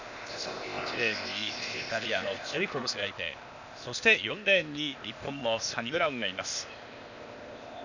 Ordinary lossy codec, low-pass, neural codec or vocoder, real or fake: none; 7.2 kHz; codec, 16 kHz, 0.8 kbps, ZipCodec; fake